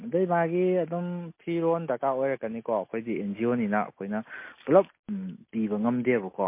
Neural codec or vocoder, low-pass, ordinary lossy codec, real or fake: none; 3.6 kHz; MP3, 24 kbps; real